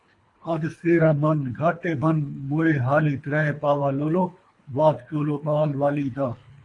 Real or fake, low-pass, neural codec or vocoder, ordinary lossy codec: fake; 10.8 kHz; codec, 24 kHz, 3 kbps, HILCodec; AAC, 48 kbps